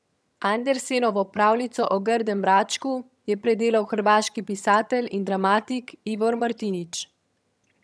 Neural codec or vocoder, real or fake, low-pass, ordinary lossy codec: vocoder, 22.05 kHz, 80 mel bands, HiFi-GAN; fake; none; none